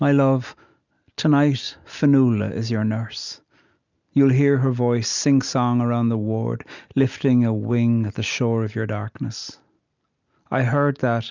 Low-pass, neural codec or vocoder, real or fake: 7.2 kHz; none; real